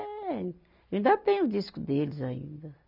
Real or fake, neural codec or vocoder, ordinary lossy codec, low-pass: real; none; none; 5.4 kHz